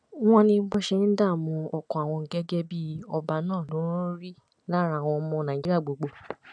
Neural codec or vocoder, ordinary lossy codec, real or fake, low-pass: none; none; real; 9.9 kHz